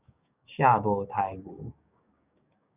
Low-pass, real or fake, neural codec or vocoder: 3.6 kHz; fake; codec, 16 kHz, 6 kbps, DAC